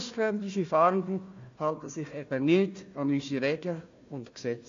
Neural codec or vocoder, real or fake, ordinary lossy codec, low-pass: codec, 16 kHz, 1 kbps, FunCodec, trained on Chinese and English, 50 frames a second; fake; AAC, 48 kbps; 7.2 kHz